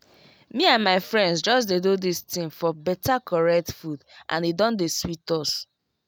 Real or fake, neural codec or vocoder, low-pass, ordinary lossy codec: real; none; none; none